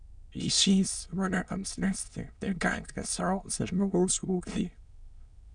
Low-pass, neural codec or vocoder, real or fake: 9.9 kHz; autoencoder, 22.05 kHz, a latent of 192 numbers a frame, VITS, trained on many speakers; fake